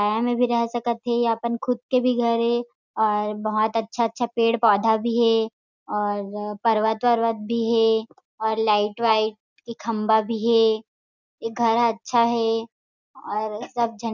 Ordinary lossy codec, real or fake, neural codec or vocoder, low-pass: none; real; none; 7.2 kHz